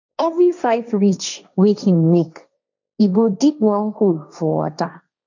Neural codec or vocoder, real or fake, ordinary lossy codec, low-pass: codec, 16 kHz, 1.1 kbps, Voila-Tokenizer; fake; none; 7.2 kHz